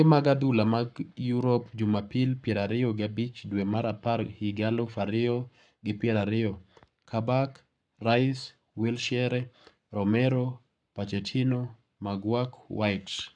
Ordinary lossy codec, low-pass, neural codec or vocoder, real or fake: none; 9.9 kHz; codec, 44.1 kHz, 7.8 kbps, Pupu-Codec; fake